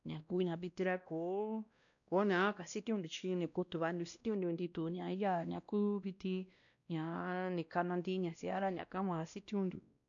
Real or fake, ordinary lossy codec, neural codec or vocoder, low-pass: fake; none; codec, 16 kHz, 1 kbps, X-Codec, WavLM features, trained on Multilingual LibriSpeech; 7.2 kHz